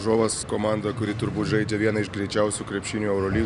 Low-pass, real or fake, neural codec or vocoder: 10.8 kHz; real; none